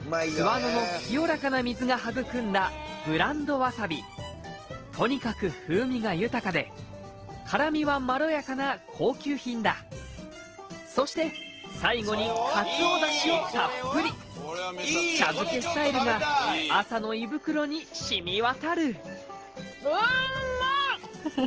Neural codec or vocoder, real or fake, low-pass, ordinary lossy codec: none; real; 7.2 kHz; Opus, 16 kbps